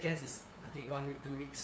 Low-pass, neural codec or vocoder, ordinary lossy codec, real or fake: none; codec, 16 kHz, 2 kbps, FunCodec, trained on LibriTTS, 25 frames a second; none; fake